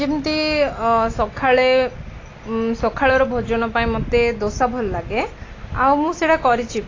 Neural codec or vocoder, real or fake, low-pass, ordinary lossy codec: none; real; 7.2 kHz; AAC, 32 kbps